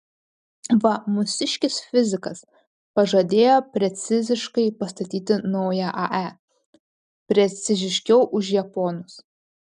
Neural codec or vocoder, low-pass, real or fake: none; 10.8 kHz; real